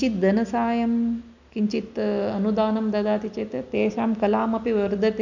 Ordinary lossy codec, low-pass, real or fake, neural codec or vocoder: none; 7.2 kHz; real; none